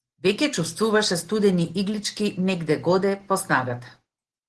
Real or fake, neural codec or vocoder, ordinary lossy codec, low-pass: real; none; Opus, 16 kbps; 10.8 kHz